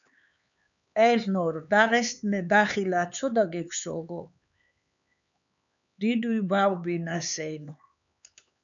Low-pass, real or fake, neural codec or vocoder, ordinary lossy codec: 7.2 kHz; fake; codec, 16 kHz, 4 kbps, X-Codec, HuBERT features, trained on LibriSpeech; MP3, 96 kbps